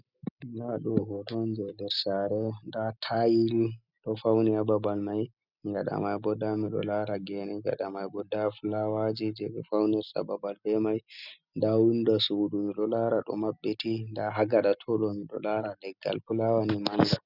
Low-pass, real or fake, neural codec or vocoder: 5.4 kHz; real; none